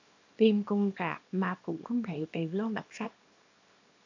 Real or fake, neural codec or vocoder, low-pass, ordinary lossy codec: fake; codec, 24 kHz, 0.9 kbps, WavTokenizer, small release; 7.2 kHz; AAC, 48 kbps